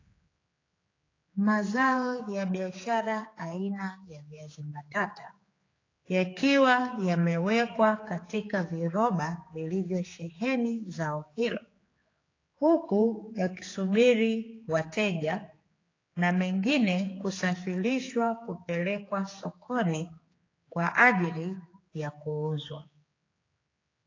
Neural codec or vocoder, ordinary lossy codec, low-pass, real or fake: codec, 16 kHz, 4 kbps, X-Codec, HuBERT features, trained on general audio; AAC, 32 kbps; 7.2 kHz; fake